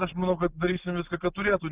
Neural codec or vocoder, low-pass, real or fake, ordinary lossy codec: none; 3.6 kHz; real; Opus, 24 kbps